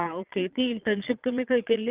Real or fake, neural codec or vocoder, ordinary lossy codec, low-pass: fake; vocoder, 22.05 kHz, 80 mel bands, Vocos; Opus, 32 kbps; 3.6 kHz